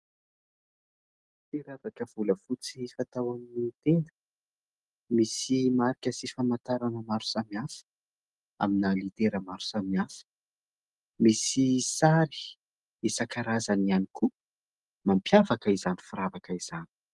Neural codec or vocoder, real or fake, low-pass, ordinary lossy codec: none; real; 10.8 kHz; Opus, 24 kbps